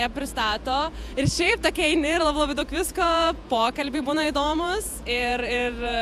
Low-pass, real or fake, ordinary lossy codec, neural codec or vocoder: 14.4 kHz; fake; AAC, 96 kbps; vocoder, 48 kHz, 128 mel bands, Vocos